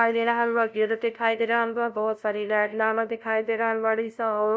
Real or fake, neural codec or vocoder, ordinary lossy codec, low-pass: fake; codec, 16 kHz, 0.5 kbps, FunCodec, trained on LibriTTS, 25 frames a second; none; none